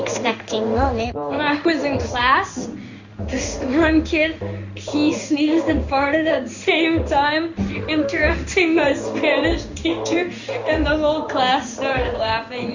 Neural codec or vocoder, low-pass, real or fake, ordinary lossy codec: codec, 16 kHz in and 24 kHz out, 1 kbps, XY-Tokenizer; 7.2 kHz; fake; Opus, 64 kbps